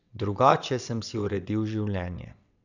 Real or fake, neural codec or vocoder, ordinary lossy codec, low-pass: fake; vocoder, 22.05 kHz, 80 mel bands, WaveNeXt; none; 7.2 kHz